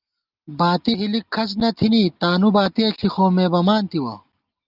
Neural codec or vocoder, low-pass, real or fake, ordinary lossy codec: none; 5.4 kHz; real; Opus, 24 kbps